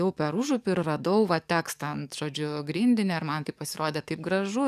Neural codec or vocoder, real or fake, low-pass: codec, 44.1 kHz, 7.8 kbps, DAC; fake; 14.4 kHz